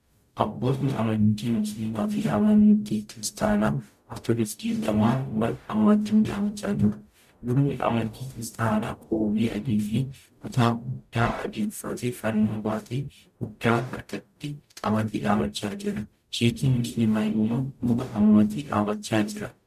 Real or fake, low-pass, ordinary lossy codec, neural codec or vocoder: fake; 14.4 kHz; MP3, 96 kbps; codec, 44.1 kHz, 0.9 kbps, DAC